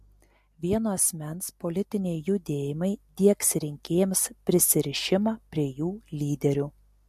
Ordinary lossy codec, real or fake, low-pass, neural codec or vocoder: MP3, 64 kbps; real; 14.4 kHz; none